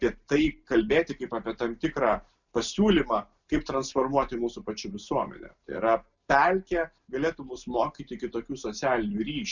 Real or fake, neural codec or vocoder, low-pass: real; none; 7.2 kHz